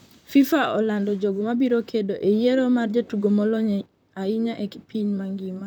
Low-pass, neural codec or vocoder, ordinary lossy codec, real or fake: 19.8 kHz; vocoder, 44.1 kHz, 128 mel bands, Pupu-Vocoder; none; fake